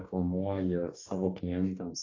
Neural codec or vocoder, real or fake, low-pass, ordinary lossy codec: codec, 44.1 kHz, 2.6 kbps, DAC; fake; 7.2 kHz; AAC, 32 kbps